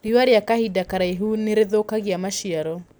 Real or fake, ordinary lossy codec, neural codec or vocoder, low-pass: real; none; none; none